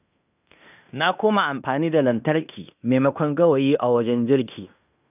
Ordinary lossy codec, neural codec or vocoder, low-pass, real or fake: none; codec, 16 kHz in and 24 kHz out, 0.9 kbps, LongCat-Audio-Codec, fine tuned four codebook decoder; 3.6 kHz; fake